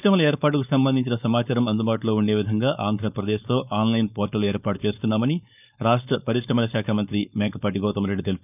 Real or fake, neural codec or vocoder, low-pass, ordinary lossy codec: fake; codec, 16 kHz, 4.8 kbps, FACodec; 3.6 kHz; none